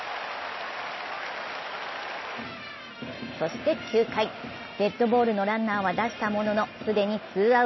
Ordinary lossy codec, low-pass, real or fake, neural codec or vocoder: MP3, 24 kbps; 7.2 kHz; real; none